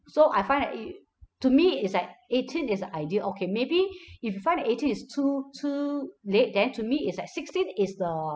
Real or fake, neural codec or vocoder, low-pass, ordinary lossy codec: real; none; none; none